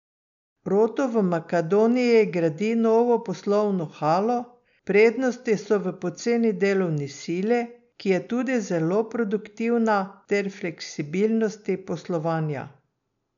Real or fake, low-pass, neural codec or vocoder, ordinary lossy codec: real; 7.2 kHz; none; MP3, 96 kbps